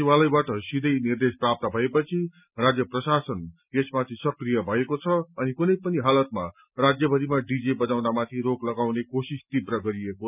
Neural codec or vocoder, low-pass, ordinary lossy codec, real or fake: none; 3.6 kHz; none; real